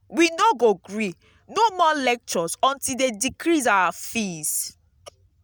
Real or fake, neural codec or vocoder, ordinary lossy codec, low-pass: real; none; none; none